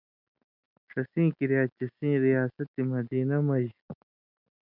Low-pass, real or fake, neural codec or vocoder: 5.4 kHz; real; none